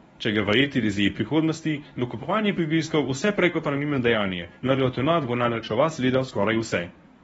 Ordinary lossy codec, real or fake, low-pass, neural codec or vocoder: AAC, 24 kbps; fake; 10.8 kHz; codec, 24 kHz, 0.9 kbps, WavTokenizer, medium speech release version 1